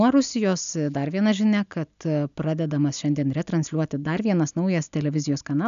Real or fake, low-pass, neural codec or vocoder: real; 7.2 kHz; none